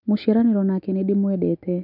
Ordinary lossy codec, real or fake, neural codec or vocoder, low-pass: none; real; none; 5.4 kHz